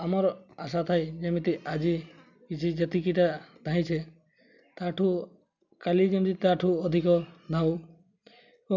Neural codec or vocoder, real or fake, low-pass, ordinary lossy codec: none; real; 7.2 kHz; none